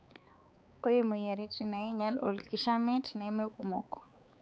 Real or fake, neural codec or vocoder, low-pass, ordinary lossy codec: fake; codec, 16 kHz, 4 kbps, X-Codec, HuBERT features, trained on balanced general audio; none; none